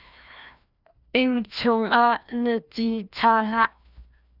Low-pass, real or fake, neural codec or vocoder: 5.4 kHz; fake; codec, 16 kHz, 1 kbps, FreqCodec, larger model